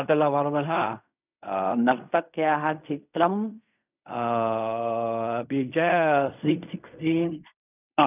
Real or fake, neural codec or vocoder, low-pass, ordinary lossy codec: fake; codec, 16 kHz in and 24 kHz out, 0.4 kbps, LongCat-Audio-Codec, fine tuned four codebook decoder; 3.6 kHz; none